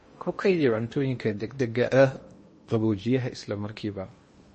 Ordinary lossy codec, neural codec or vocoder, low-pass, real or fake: MP3, 32 kbps; codec, 16 kHz in and 24 kHz out, 0.8 kbps, FocalCodec, streaming, 65536 codes; 10.8 kHz; fake